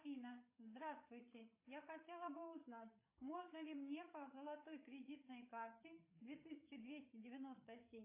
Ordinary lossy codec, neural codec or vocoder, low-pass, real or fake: AAC, 24 kbps; codec, 16 kHz, 8 kbps, FreqCodec, larger model; 3.6 kHz; fake